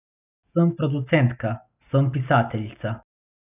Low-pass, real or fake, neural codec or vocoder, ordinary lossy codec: 3.6 kHz; real; none; none